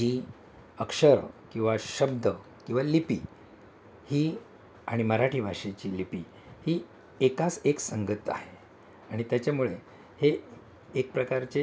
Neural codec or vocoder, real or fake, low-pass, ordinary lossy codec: none; real; none; none